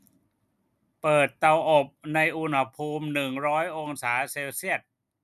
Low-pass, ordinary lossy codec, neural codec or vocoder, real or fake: 14.4 kHz; none; none; real